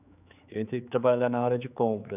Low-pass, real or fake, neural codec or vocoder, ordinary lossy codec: 3.6 kHz; fake; codec, 16 kHz, 4 kbps, X-Codec, HuBERT features, trained on general audio; none